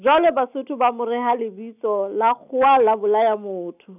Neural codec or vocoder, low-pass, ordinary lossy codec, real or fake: none; 3.6 kHz; none; real